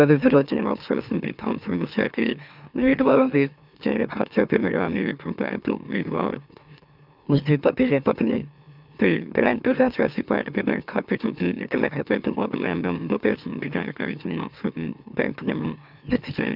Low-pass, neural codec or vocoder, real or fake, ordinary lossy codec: 5.4 kHz; autoencoder, 44.1 kHz, a latent of 192 numbers a frame, MeloTTS; fake; none